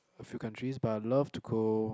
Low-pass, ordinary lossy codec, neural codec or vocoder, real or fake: none; none; none; real